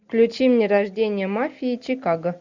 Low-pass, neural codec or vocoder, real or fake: 7.2 kHz; none; real